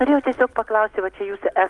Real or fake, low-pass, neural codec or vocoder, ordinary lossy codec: real; 10.8 kHz; none; Opus, 32 kbps